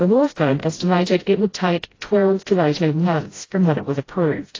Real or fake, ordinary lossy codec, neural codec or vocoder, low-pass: fake; AAC, 32 kbps; codec, 16 kHz, 0.5 kbps, FreqCodec, smaller model; 7.2 kHz